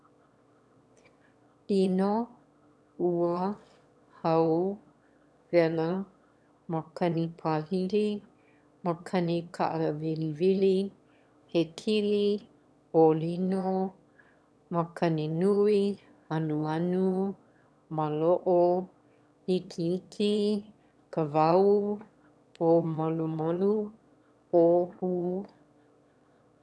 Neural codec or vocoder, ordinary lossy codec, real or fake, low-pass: autoencoder, 22.05 kHz, a latent of 192 numbers a frame, VITS, trained on one speaker; none; fake; none